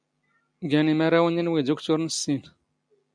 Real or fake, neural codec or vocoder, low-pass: real; none; 9.9 kHz